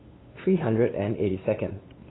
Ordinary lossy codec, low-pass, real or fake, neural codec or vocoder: AAC, 16 kbps; 7.2 kHz; fake; codec, 16 kHz, 8 kbps, FunCodec, trained on LibriTTS, 25 frames a second